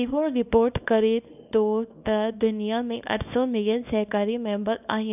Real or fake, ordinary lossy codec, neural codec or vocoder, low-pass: fake; none; codec, 24 kHz, 0.9 kbps, WavTokenizer, small release; 3.6 kHz